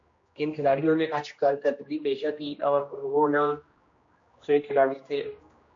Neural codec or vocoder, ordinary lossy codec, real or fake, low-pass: codec, 16 kHz, 1 kbps, X-Codec, HuBERT features, trained on general audio; MP3, 48 kbps; fake; 7.2 kHz